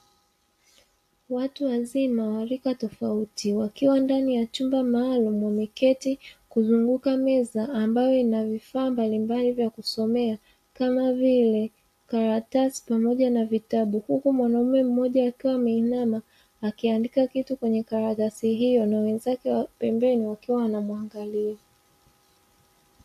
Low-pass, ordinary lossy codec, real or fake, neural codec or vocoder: 14.4 kHz; AAC, 64 kbps; real; none